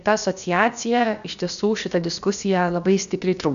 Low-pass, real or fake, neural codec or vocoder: 7.2 kHz; fake; codec, 16 kHz, about 1 kbps, DyCAST, with the encoder's durations